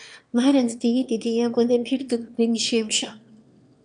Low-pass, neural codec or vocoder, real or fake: 9.9 kHz; autoencoder, 22.05 kHz, a latent of 192 numbers a frame, VITS, trained on one speaker; fake